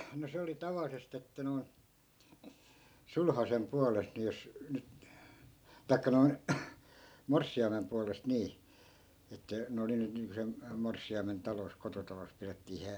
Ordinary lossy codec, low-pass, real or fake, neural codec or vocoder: none; none; real; none